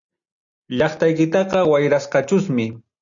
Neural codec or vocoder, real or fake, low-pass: none; real; 7.2 kHz